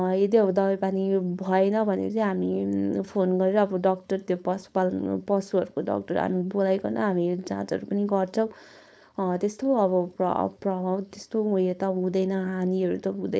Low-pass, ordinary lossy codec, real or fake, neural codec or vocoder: none; none; fake; codec, 16 kHz, 4.8 kbps, FACodec